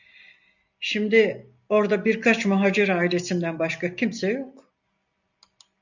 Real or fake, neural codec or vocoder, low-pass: real; none; 7.2 kHz